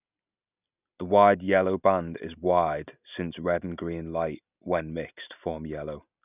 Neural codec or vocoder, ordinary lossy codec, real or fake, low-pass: none; none; real; 3.6 kHz